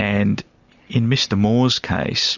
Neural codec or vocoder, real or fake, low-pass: none; real; 7.2 kHz